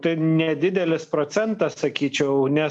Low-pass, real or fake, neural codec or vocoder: 10.8 kHz; real; none